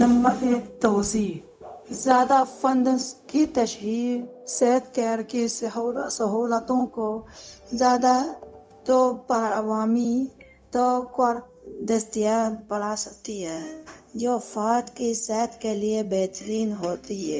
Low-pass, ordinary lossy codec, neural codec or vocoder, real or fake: none; none; codec, 16 kHz, 0.4 kbps, LongCat-Audio-Codec; fake